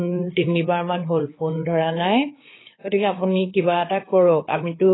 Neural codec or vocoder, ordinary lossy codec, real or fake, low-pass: codec, 16 kHz, 4 kbps, FreqCodec, larger model; AAC, 16 kbps; fake; 7.2 kHz